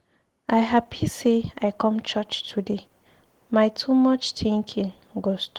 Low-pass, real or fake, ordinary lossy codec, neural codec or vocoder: 19.8 kHz; real; Opus, 16 kbps; none